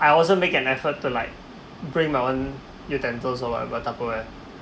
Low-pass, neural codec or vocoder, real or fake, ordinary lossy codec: none; none; real; none